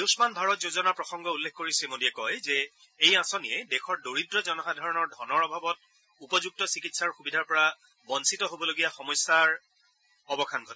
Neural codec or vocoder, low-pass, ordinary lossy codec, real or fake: none; none; none; real